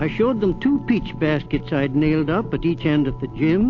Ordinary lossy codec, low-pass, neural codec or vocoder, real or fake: AAC, 48 kbps; 7.2 kHz; none; real